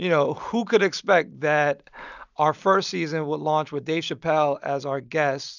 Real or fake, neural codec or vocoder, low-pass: real; none; 7.2 kHz